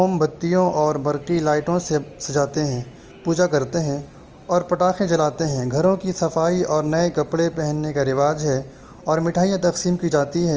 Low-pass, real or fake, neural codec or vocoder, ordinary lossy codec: 7.2 kHz; real; none; Opus, 24 kbps